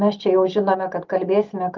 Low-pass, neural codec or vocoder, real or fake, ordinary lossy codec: 7.2 kHz; none; real; Opus, 24 kbps